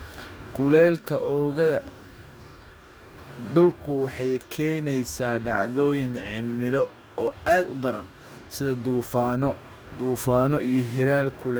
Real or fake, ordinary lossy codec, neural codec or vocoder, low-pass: fake; none; codec, 44.1 kHz, 2.6 kbps, DAC; none